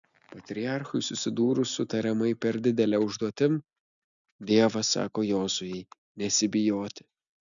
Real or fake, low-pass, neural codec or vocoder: real; 7.2 kHz; none